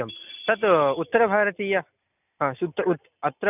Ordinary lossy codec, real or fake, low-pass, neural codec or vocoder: none; real; 3.6 kHz; none